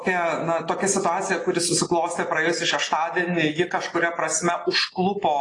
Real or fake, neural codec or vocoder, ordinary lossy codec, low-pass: real; none; AAC, 32 kbps; 10.8 kHz